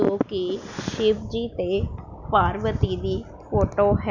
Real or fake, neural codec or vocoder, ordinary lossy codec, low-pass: real; none; none; 7.2 kHz